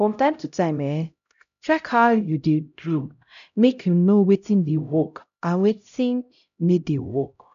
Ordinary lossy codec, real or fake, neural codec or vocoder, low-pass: none; fake; codec, 16 kHz, 0.5 kbps, X-Codec, HuBERT features, trained on LibriSpeech; 7.2 kHz